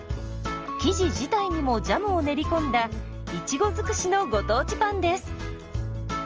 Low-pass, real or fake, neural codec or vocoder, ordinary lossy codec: 7.2 kHz; real; none; Opus, 24 kbps